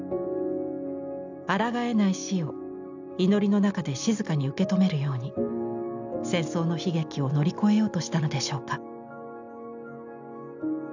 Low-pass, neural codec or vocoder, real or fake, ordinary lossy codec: 7.2 kHz; none; real; none